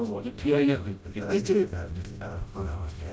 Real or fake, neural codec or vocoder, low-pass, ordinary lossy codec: fake; codec, 16 kHz, 0.5 kbps, FreqCodec, smaller model; none; none